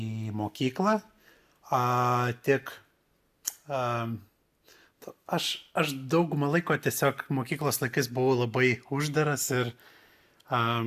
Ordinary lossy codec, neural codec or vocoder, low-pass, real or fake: AAC, 96 kbps; none; 14.4 kHz; real